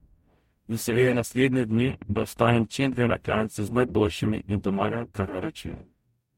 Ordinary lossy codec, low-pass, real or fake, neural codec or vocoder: MP3, 64 kbps; 19.8 kHz; fake; codec, 44.1 kHz, 0.9 kbps, DAC